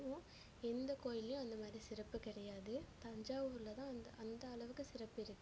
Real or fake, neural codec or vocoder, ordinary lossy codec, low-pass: real; none; none; none